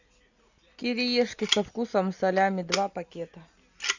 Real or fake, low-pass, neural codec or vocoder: real; 7.2 kHz; none